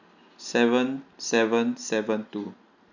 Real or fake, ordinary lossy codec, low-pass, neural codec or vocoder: real; none; 7.2 kHz; none